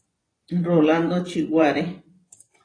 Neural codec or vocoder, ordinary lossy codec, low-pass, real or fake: vocoder, 24 kHz, 100 mel bands, Vocos; AAC, 32 kbps; 9.9 kHz; fake